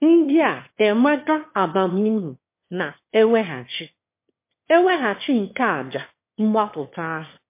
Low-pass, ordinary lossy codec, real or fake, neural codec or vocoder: 3.6 kHz; MP3, 24 kbps; fake; autoencoder, 22.05 kHz, a latent of 192 numbers a frame, VITS, trained on one speaker